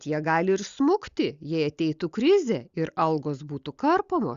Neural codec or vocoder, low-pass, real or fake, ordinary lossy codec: none; 7.2 kHz; real; Opus, 64 kbps